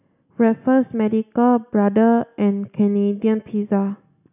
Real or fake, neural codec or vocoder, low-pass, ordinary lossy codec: real; none; 3.6 kHz; none